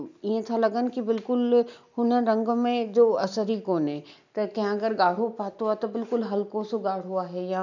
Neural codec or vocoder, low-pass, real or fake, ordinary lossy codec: none; 7.2 kHz; real; none